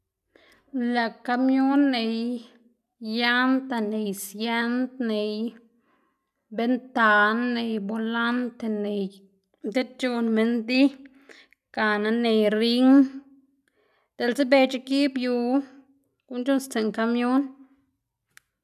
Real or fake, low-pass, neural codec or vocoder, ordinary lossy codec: real; 14.4 kHz; none; none